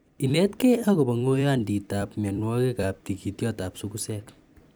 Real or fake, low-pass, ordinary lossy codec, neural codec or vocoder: fake; none; none; vocoder, 44.1 kHz, 128 mel bands every 256 samples, BigVGAN v2